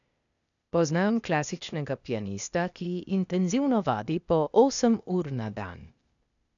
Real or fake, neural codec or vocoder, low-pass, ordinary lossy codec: fake; codec, 16 kHz, 0.8 kbps, ZipCodec; 7.2 kHz; none